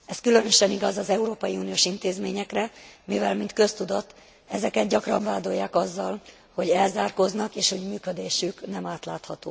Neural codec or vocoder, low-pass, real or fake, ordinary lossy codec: none; none; real; none